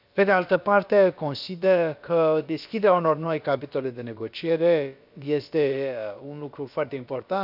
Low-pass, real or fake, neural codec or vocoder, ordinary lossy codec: 5.4 kHz; fake; codec, 16 kHz, about 1 kbps, DyCAST, with the encoder's durations; none